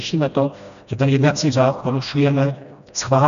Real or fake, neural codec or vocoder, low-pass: fake; codec, 16 kHz, 1 kbps, FreqCodec, smaller model; 7.2 kHz